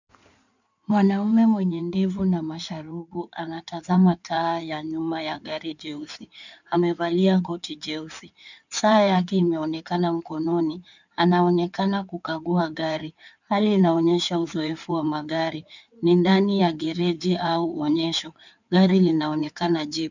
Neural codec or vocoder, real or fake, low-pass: codec, 16 kHz in and 24 kHz out, 2.2 kbps, FireRedTTS-2 codec; fake; 7.2 kHz